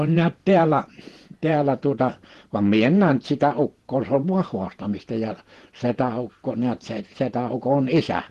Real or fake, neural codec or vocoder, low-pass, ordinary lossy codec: fake; vocoder, 48 kHz, 128 mel bands, Vocos; 14.4 kHz; Opus, 16 kbps